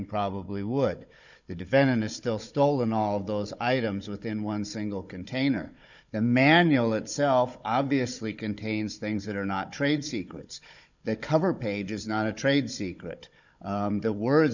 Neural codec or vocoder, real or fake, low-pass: codec, 16 kHz, 16 kbps, FunCodec, trained on Chinese and English, 50 frames a second; fake; 7.2 kHz